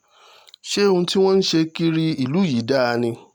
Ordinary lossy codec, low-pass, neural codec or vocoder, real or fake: none; 19.8 kHz; none; real